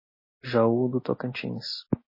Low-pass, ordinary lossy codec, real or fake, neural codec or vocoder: 5.4 kHz; MP3, 24 kbps; real; none